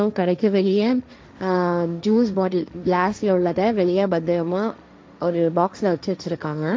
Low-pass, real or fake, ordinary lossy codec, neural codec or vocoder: none; fake; none; codec, 16 kHz, 1.1 kbps, Voila-Tokenizer